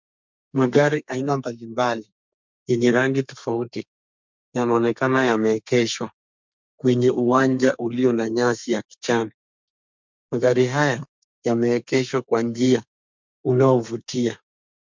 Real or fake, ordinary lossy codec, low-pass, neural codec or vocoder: fake; MP3, 64 kbps; 7.2 kHz; codec, 32 kHz, 1.9 kbps, SNAC